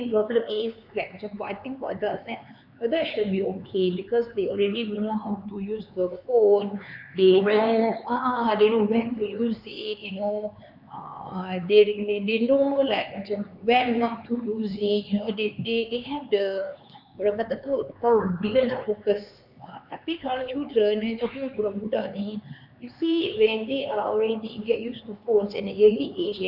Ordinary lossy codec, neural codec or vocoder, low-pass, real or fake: none; codec, 16 kHz, 4 kbps, X-Codec, HuBERT features, trained on LibriSpeech; 5.4 kHz; fake